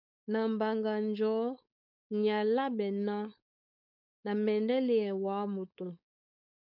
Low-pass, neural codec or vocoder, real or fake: 5.4 kHz; codec, 16 kHz, 4.8 kbps, FACodec; fake